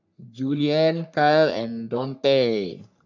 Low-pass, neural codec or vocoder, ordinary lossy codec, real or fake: 7.2 kHz; codec, 44.1 kHz, 3.4 kbps, Pupu-Codec; none; fake